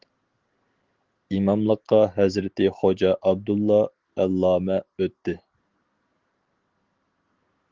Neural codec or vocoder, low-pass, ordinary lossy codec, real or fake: none; 7.2 kHz; Opus, 16 kbps; real